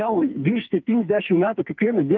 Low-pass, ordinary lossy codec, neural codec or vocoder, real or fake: 7.2 kHz; Opus, 24 kbps; codec, 44.1 kHz, 2.6 kbps, SNAC; fake